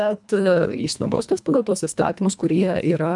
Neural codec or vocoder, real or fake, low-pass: codec, 24 kHz, 1.5 kbps, HILCodec; fake; 10.8 kHz